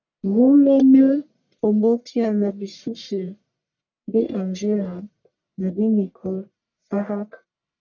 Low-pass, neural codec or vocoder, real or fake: 7.2 kHz; codec, 44.1 kHz, 1.7 kbps, Pupu-Codec; fake